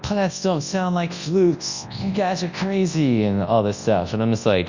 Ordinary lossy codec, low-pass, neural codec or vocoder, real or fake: Opus, 64 kbps; 7.2 kHz; codec, 24 kHz, 0.9 kbps, WavTokenizer, large speech release; fake